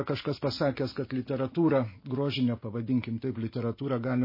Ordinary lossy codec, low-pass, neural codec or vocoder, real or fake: MP3, 24 kbps; 5.4 kHz; none; real